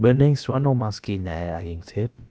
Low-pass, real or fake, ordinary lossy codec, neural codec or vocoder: none; fake; none; codec, 16 kHz, about 1 kbps, DyCAST, with the encoder's durations